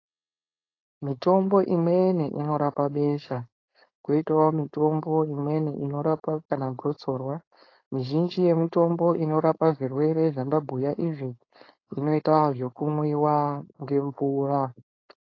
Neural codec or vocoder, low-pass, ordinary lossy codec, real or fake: codec, 16 kHz, 4.8 kbps, FACodec; 7.2 kHz; AAC, 32 kbps; fake